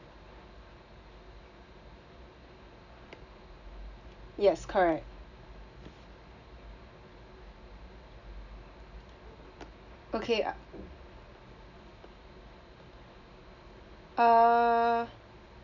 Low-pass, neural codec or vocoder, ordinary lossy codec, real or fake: 7.2 kHz; none; none; real